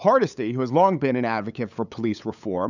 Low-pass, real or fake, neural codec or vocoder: 7.2 kHz; fake; codec, 16 kHz, 8 kbps, FunCodec, trained on LibriTTS, 25 frames a second